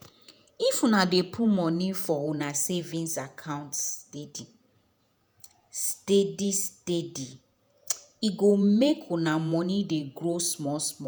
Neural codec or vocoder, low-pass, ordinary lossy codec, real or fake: vocoder, 48 kHz, 128 mel bands, Vocos; none; none; fake